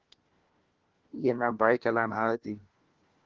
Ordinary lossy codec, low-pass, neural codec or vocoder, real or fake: Opus, 16 kbps; 7.2 kHz; codec, 16 kHz, 1 kbps, FunCodec, trained on LibriTTS, 50 frames a second; fake